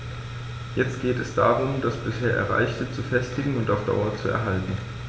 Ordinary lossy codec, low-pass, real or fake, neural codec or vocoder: none; none; real; none